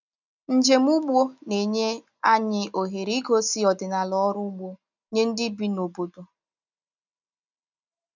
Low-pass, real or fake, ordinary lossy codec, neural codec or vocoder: 7.2 kHz; real; none; none